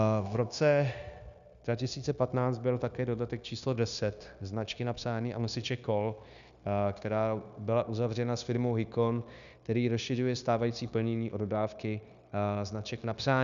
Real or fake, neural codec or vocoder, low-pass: fake; codec, 16 kHz, 0.9 kbps, LongCat-Audio-Codec; 7.2 kHz